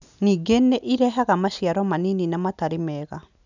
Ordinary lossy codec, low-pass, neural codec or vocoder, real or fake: none; 7.2 kHz; none; real